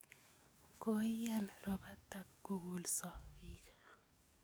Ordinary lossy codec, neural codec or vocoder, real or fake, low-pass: none; codec, 44.1 kHz, 7.8 kbps, DAC; fake; none